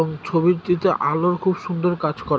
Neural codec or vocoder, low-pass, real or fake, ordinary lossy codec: none; none; real; none